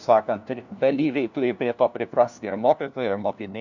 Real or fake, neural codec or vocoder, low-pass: fake; codec, 16 kHz, 1 kbps, FunCodec, trained on LibriTTS, 50 frames a second; 7.2 kHz